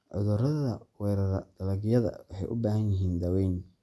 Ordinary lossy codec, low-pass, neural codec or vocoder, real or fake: none; none; none; real